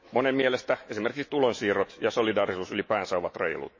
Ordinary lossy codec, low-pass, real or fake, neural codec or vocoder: MP3, 32 kbps; 7.2 kHz; fake; autoencoder, 48 kHz, 128 numbers a frame, DAC-VAE, trained on Japanese speech